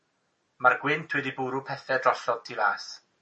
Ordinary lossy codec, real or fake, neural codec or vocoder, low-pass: MP3, 32 kbps; real; none; 10.8 kHz